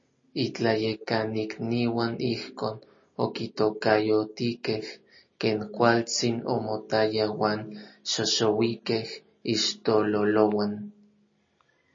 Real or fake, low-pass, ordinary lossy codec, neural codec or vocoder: real; 7.2 kHz; MP3, 32 kbps; none